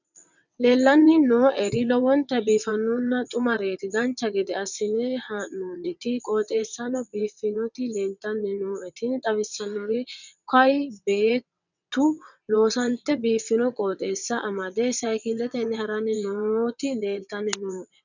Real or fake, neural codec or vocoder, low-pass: fake; vocoder, 22.05 kHz, 80 mel bands, WaveNeXt; 7.2 kHz